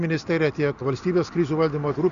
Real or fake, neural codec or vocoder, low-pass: real; none; 7.2 kHz